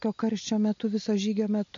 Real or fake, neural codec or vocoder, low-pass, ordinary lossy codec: real; none; 7.2 kHz; MP3, 48 kbps